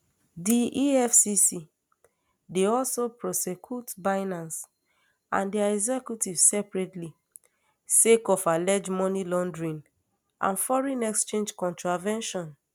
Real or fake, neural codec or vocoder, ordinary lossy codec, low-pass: real; none; none; none